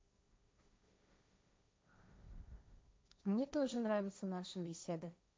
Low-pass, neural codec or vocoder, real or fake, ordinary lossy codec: none; codec, 16 kHz, 1.1 kbps, Voila-Tokenizer; fake; none